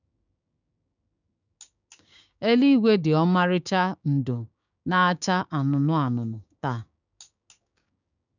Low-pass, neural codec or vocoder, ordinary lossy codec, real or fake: 7.2 kHz; codec, 16 kHz, 6 kbps, DAC; none; fake